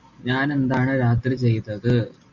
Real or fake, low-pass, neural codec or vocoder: real; 7.2 kHz; none